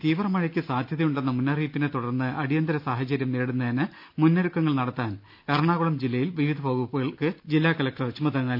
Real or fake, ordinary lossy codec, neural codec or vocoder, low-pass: real; none; none; 5.4 kHz